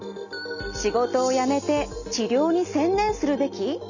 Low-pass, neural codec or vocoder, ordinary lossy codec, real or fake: 7.2 kHz; none; none; real